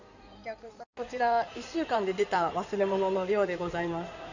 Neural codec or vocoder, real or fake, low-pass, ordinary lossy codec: codec, 16 kHz in and 24 kHz out, 2.2 kbps, FireRedTTS-2 codec; fake; 7.2 kHz; none